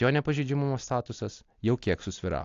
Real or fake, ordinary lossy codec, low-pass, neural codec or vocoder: real; AAC, 48 kbps; 7.2 kHz; none